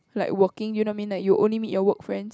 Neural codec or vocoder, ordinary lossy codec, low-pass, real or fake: none; none; none; real